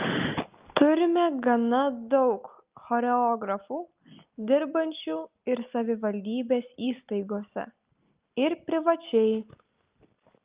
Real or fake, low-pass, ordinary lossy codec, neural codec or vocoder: real; 3.6 kHz; Opus, 24 kbps; none